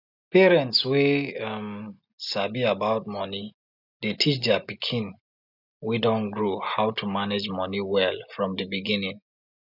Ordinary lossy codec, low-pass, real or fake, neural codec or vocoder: none; 5.4 kHz; real; none